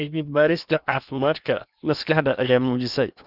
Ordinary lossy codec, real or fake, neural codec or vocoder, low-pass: none; fake; codec, 16 kHz in and 24 kHz out, 0.8 kbps, FocalCodec, streaming, 65536 codes; 5.4 kHz